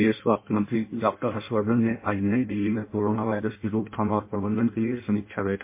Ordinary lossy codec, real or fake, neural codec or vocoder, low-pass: MP3, 24 kbps; fake; codec, 16 kHz in and 24 kHz out, 0.6 kbps, FireRedTTS-2 codec; 3.6 kHz